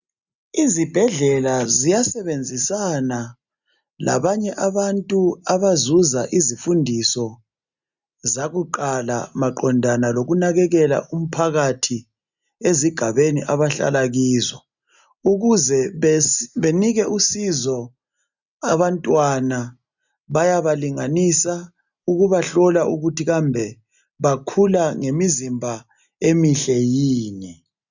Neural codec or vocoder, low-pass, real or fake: none; 7.2 kHz; real